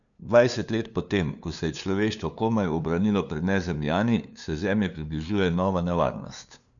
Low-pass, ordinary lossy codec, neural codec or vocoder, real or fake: 7.2 kHz; none; codec, 16 kHz, 2 kbps, FunCodec, trained on LibriTTS, 25 frames a second; fake